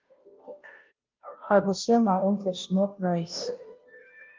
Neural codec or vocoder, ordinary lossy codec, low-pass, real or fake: codec, 16 kHz, 0.5 kbps, FunCodec, trained on Chinese and English, 25 frames a second; Opus, 24 kbps; 7.2 kHz; fake